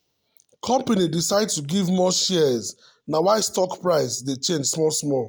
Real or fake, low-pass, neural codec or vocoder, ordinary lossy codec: real; none; none; none